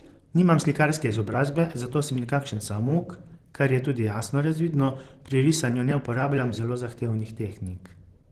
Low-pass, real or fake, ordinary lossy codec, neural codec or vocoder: 14.4 kHz; fake; Opus, 16 kbps; vocoder, 44.1 kHz, 128 mel bands, Pupu-Vocoder